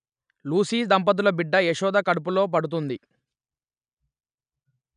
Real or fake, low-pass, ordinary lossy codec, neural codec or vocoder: real; 9.9 kHz; none; none